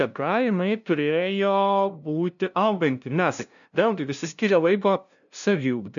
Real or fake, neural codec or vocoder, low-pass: fake; codec, 16 kHz, 0.5 kbps, FunCodec, trained on LibriTTS, 25 frames a second; 7.2 kHz